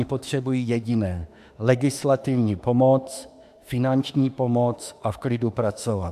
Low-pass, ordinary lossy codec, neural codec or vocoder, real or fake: 14.4 kHz; AAC, 96 kbps; autoencoder, 48 kHz, 32 numbers a frame, DAC-VAE, trained on Japanese speech; fake